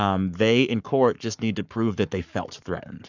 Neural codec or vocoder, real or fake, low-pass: codec, 44.1 kHz, 7.8 kbps, Pupu-Codec; fake; 7.2 kHz